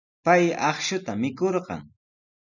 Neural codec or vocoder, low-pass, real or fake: none; 7.2 kHz; real